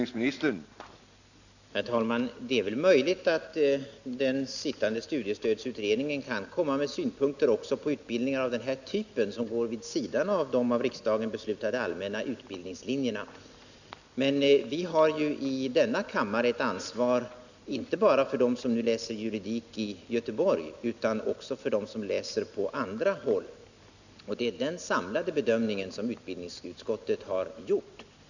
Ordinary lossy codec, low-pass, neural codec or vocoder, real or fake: none; 7.2 kHz; none; real